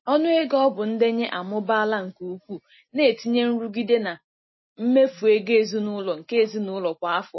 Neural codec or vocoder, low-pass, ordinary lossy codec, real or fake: none; 7.2 kHz; MP3, 24 kbps; real